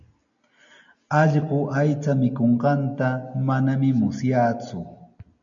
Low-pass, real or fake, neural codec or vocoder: 7.2 kHz; real; none